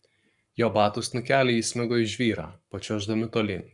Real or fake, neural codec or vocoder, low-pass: fake; codec, 44.1 kHz, 7.8 kbps, Pupu-Codec; 10.8 kHz